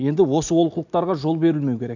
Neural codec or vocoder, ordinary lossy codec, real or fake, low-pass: none; none; real; 7.2 kHz